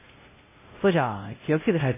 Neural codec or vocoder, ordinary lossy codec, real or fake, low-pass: codec, 16 kHz, 0.5 kbps, X-Codec, WavLM features, trained on Multilingual LibriSpeech; MP3, 16 kbps; fake; 3.6 kHz